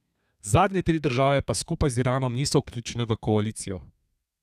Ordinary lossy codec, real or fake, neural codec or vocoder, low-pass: none; fake; codec, 32 kHz, 1.9 kbps, SNAC; 14.4 kHz